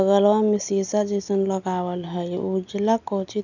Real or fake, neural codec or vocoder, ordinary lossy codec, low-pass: real; none; none; 7.2 kHz